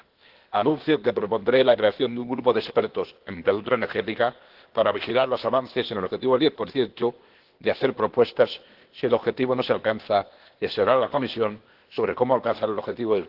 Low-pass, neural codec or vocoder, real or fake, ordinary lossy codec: 5.4 kHz; codec, 16 kHz, 0.8 kbps, ZipCodec; fake; Opus, 16 kbps